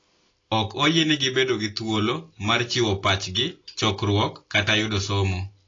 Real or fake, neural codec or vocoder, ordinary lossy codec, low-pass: real; none; AAC, 32 kbps; 7.2 kHz